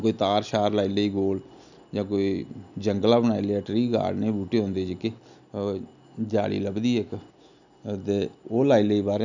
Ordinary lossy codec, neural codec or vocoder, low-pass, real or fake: none; none; 7.2 kHz; real